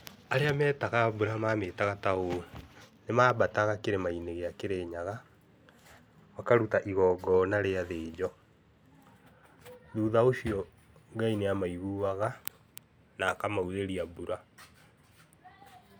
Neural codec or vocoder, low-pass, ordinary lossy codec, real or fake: none; none; none; real